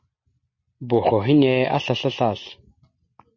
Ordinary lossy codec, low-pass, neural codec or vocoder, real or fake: MP3, 32 kbps; 7.2 kHz; none; real